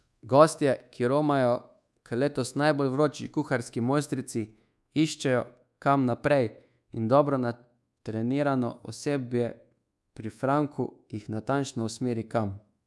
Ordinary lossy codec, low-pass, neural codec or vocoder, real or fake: none; none; codec, 24 kHz, 1.2 kbps, DualCodec; fake